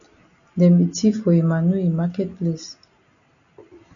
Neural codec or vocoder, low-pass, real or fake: none; 7.2 kHz; real